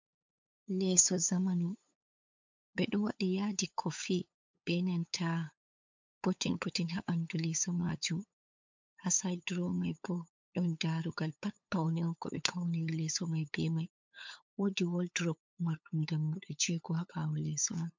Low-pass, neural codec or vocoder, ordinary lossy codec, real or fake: 7.2 kHz; codec, 16 kHz, 8 kbps, FunCodec, trained on LibriTTS, 25 frames a second; MP3, 64 kbps; fake